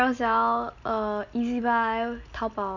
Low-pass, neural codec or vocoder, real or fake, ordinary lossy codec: 7.2 kHz; none; real; none